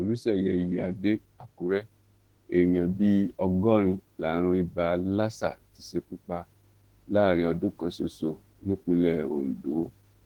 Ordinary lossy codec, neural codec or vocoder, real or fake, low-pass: Opus, 16 kbps; autoencoder, 48 kHz, 32 numbers a frame, DAC-VAE, trained on Japanese speech; fake; 19.8 kHz